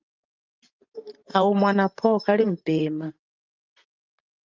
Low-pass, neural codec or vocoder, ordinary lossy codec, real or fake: 7.2 kHz; vocoder, 44.1 kHz, 128 mel bands every 512 samples, BigVGAN v2; Opus, 24 kbps; fake